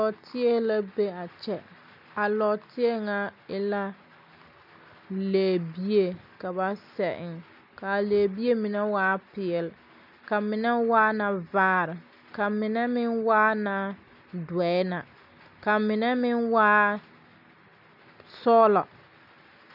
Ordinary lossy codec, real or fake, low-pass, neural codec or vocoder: AAC, 48 kbps; real; 5.4 kHz; none